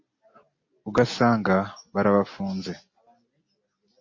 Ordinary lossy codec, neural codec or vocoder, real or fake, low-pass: MP3, 32 kbps; none; real; 7.2 kHz